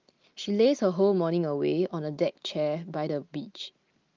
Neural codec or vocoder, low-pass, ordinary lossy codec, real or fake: none; 7.2 kHz; Opus, 32 kbps; real